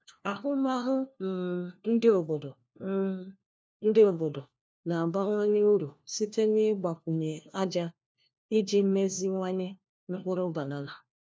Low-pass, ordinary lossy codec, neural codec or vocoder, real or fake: none; none; codec, 16 kHz, 1 kbps, FunCodec, trained on LibriTTS, 50 frames a second; fake